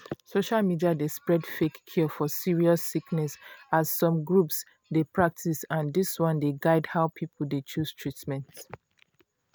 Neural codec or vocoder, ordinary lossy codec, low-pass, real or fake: none; none; none; real